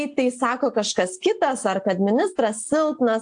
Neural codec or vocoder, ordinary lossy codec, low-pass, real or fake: none; AAC, 64 kbps; 9.9 kHz; real